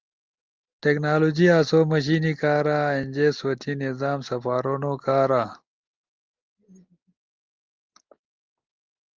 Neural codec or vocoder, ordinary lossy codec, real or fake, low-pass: none; Opus, 32 kbps; real; 7.2 kHz